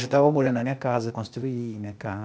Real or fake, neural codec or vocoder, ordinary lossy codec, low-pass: fake; codec, 16 kHz, 0.8 kbps, ZipCodec; none; none